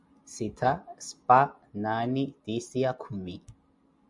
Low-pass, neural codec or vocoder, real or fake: 10.8 kHz; none; real